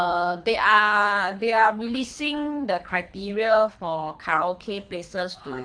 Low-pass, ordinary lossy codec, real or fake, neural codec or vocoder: 9.9 kHz; AAC, 64 kbps; fake; codec, 24 kHz, 3 kbps, HILCodec